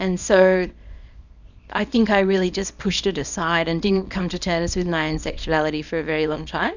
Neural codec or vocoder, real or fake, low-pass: codec, 24 kHz, 0.9 kbps, WavTokenizer, small release; fake; 7.2 kHz